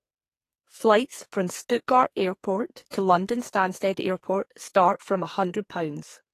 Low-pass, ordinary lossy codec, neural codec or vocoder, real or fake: 14.4 kHz; AAC, 48 kbps; codec, 44.1 kHz, 2.6 kbps, SNAC; fake